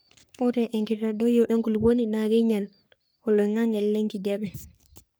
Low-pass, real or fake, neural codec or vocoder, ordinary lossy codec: none; fake; codec, 44.1 kHz, 3.4 kbps, Pupu-Codec; none